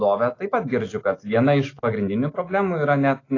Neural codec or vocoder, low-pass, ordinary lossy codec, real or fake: none; 7.2 kHz; AAC, 32 kbps; real